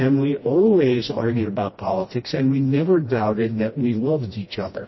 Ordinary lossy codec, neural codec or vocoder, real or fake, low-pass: MP3, 24 kbps; codec, 16 kHz, 1 kbps, FreqCodec, smaller model; fake; 7.2 kHz